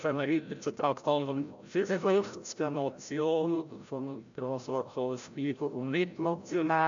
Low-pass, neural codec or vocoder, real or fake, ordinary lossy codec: 7.2 kHz; codec, 16 kHz, 0.5 kbps, FreqCodec, larger model; fake; AAC, 64 kbps